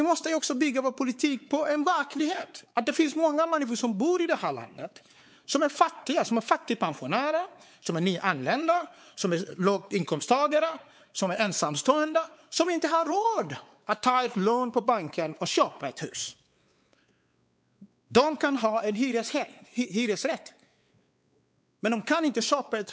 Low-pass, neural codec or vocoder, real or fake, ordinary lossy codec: none; codec, 16 kHz, 4 kbps, X-Codec, WavLM features, trained on Multilingual LibriSpeech; fake; none